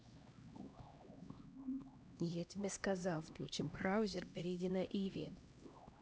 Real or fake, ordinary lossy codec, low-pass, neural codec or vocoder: fake; none; none; codec, 16 kHz, 1 kbps, X-Codec, HuBERT features, trained on LibriSpeech